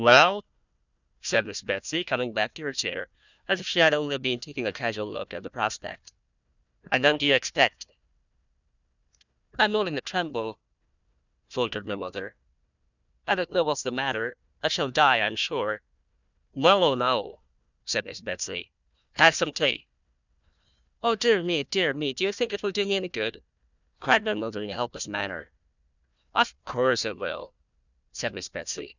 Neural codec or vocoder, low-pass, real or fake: codec, 16 kHz, 1 kbps, FunCodec, trained on Chinese and English, 50 frames a second; 7.2 kHz; fake